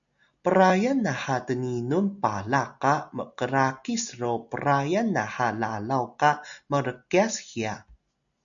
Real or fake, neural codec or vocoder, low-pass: real; none; 7.2 kHz